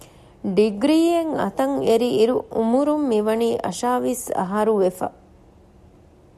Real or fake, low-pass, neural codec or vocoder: real; 14.4 kHz; none